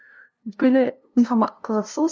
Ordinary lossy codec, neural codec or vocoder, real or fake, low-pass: none; codec, 16 kHz, 0.5 kbps, FunCodec, trained on LibriTTS, 25 frames a second; fake; none